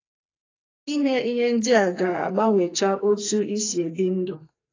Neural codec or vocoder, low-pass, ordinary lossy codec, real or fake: codec, 44.1 kHz, 2.6 kbps, SNAC; 7.2 kHz; AAC, 32 kbps; fake